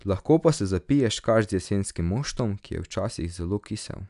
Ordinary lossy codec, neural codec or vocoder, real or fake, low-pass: none; none; real; 10.8 kHz